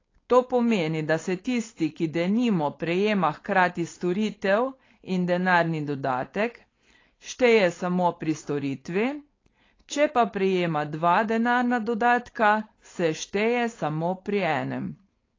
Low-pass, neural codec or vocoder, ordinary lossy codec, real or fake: 7.2 kHz; codec, 16 kHz, 4.8 kbps, FACodec; AAC, 32 kbps; fake